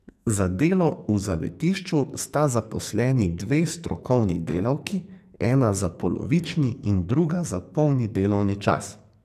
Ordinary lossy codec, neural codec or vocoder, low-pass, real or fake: none; codec, 44.1 kHz, 2.6 kbps, SNAC; 14.4 kHz; fake